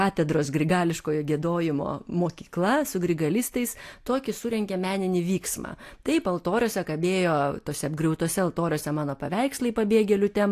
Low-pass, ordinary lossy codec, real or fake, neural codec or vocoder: 14.4 kHz; AAC, 64 kbps; real; none